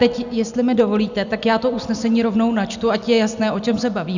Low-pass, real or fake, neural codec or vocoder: 7.2 kHz; real; none